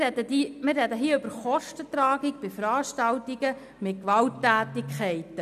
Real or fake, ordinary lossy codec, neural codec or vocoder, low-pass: real; none; none; 14.4 kHz